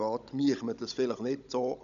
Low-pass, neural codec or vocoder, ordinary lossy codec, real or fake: 7.2 kHz; codec, 16 kHz, 16 kbps, FunCodec, trained on Chinese and English, 50 frames a second; none; fake